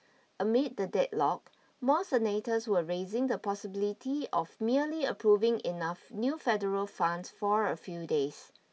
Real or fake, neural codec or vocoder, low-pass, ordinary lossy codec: real; none; none; none